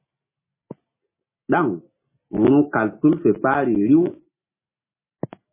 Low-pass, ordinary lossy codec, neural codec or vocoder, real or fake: 3.6 kHz; MP3, 16 kbps; none; real